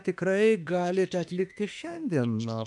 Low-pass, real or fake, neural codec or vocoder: 10.8 kHz; fake; autoencoder, 48 kHz, 32 numbers a frame, DAC-VAE, trained on Japanese speech